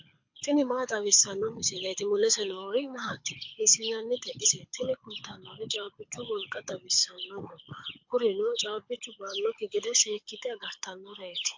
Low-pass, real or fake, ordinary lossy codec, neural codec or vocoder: 7.2 kHz; fake; MP3, 48 kbps; codec, 24 kHz, 6 kbps, HILCodec